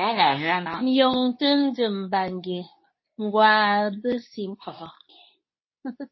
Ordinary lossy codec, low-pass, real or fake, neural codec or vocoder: MP3, 24 kbps; 7.2 kHz; fake; codec, 24 kHz, 0.9 kbps, WavTokenizer, medium speech release version 2